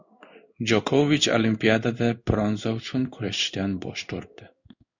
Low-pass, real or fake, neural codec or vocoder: 7.2 kHz; fake; codec, 16 kHz in and 24 kHz out, 1 kbps, XY-Tokenizer